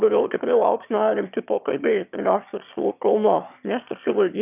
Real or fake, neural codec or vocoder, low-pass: fake; autoencoder, 22.05 kHz, a latent of 192 numbers a frame, VITS, trained on one speaker; 3.6 kHz